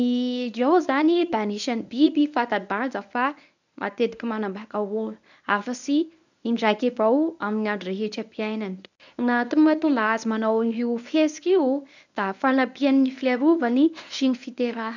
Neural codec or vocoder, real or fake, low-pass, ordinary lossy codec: codec, 24 kHz, 0.9 kbps, WavTokenizer, medium speech release version 1; fake; 7.2 kHz; none